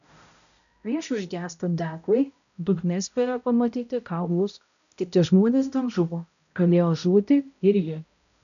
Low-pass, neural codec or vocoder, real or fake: 7.2 kHz; codec, 16 kHz, 0.5 kbps, X-Codec, HuBERT features, trained on balanced general audio; fake